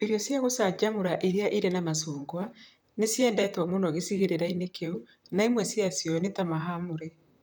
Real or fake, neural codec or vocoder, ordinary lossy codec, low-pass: fake; vocoder, 44.1 kHz, 128 mel bands, Pupu-Vocoder; none; none